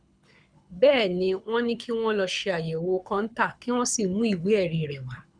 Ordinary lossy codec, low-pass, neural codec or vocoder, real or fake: none; 9.9 kHz; codec, 24 kHz, 6 kbps, HILCodec; fake